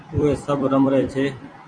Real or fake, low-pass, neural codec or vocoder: fake; 9.9 kHz; vocoder, 44.1 kHz, 128 mel bands every 256 samples, BigVGAN v2